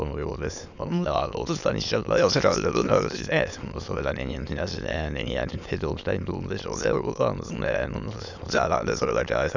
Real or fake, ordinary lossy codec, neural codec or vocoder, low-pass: fake; none; autoencoder, 22.05 kHz, a latent of 192 numbers a frame, VITS, trained on many speakers; 7.2 kHz